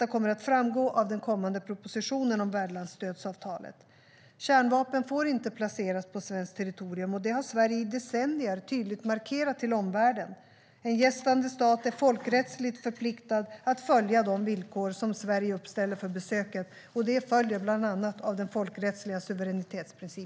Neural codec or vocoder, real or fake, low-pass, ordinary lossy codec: none; real; none; none